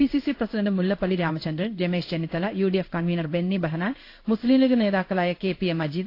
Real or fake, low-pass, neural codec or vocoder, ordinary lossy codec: fake; 5.4 kHz; codec, 16 kHz in and 24 kHz out, 1 kbps, XY-Tokenizer; AAC, 32 kbps